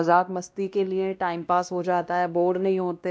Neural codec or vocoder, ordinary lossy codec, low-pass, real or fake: codec, 16 kHz, 1 kbps, X-Codec, WavLM features, trained on Multilingual LibriSpeech; none; none; fake